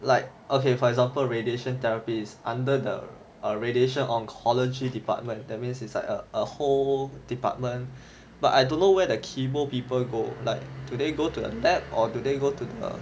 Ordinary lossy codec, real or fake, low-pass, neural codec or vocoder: none; real; none; none